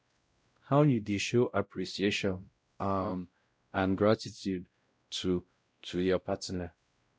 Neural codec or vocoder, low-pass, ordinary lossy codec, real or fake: codec, 16 kHz, 0.5 kbps, X-Codec, WavLM features, trained on Multilingual LibriSpeech; none; none; fake